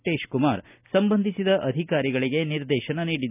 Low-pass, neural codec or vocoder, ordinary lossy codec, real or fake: 3.6 kHz; none; none; real